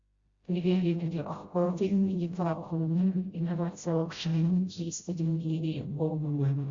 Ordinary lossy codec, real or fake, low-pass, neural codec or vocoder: Opus, 64 kbps; fake; 7.2 kHz; codec, 16 kHz, 0.5 kbps, FreqCodec, smaller model